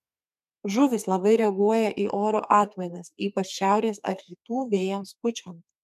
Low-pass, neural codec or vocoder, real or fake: 14.4 kHz; codec, 32 kHz, 1.9 kbps, SNAC; fake